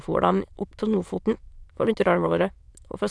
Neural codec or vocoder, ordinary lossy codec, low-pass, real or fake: autoencoder, 22.05 kHz, a latent of 192 numbers a frame, VITS, trained on many speakers; none; none; fake